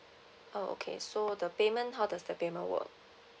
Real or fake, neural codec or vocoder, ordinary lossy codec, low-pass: real; none; none; none